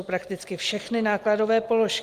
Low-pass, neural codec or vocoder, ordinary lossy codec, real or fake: 14.4 kHz; none; Opus, 24 kbps; real